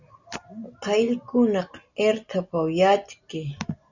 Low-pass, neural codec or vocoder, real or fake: 7.2 kHz; none; real